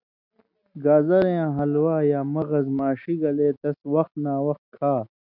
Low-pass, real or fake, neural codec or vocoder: 5.4 kHz; real; none